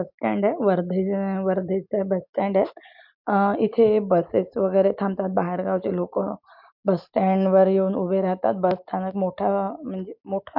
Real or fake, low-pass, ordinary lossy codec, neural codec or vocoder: real; 5.4 kHz; MP3, 48 kbps; none